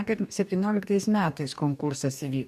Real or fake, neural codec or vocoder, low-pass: fake; codec, 44.1 kHz, 2.6 kbps, DAC; 14.4 kHz